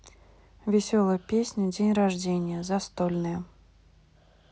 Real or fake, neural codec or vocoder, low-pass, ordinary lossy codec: real; none; none; none